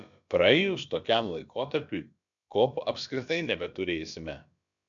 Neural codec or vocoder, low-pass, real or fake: codec, 16 kHz, about 1 kbps, DyCAST, with the encoder's durations; 7.2 kHz; fake